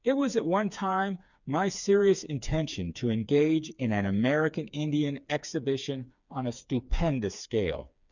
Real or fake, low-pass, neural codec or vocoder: fake; 7.2 kHz; codec, 16 kHz, 4 kbps, FreqCodec, smaller model